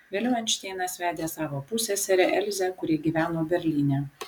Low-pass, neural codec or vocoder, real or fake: 19.8 kHz; none; real